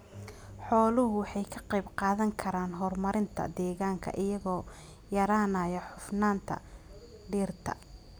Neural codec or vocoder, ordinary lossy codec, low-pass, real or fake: none; none; none; real